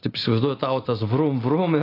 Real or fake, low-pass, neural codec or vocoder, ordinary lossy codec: real; 5.4 kHz; none; AAC, 24 kbps